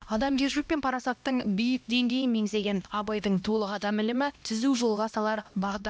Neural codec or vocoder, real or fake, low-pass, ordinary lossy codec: codec, 16 kHz, 1 kbps, X-Codec, HuBERT features, trained on LibriSpeech; fake; none; none